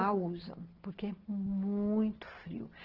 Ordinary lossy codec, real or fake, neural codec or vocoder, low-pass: Opus, 16 kbps; real; none; 5.4 kHz